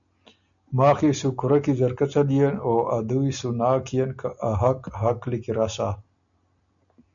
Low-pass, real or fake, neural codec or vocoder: 7.2 kHz; real; none